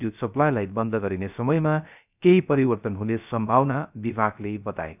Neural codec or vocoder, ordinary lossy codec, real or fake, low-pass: codec, 16 kHz, 0.3 kbps, FocalCodec; none; fake; 3.6 kHz